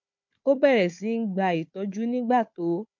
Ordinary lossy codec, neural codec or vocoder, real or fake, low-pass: MP3, 48 kbps; codec, 16 kHz, 4 kbps, FunCodec, trained on Chinese and English, 50 frames a second; fake; 7.2 kHz